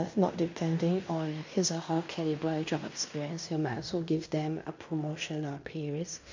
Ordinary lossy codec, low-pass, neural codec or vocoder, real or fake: MP3, 64 kbps; 7.2 kHz; codec, 16 kHz in and 24 kHz out, 0.9 kbps, LongCat-Audio-Codec, fine tuned four codebook decoder; fake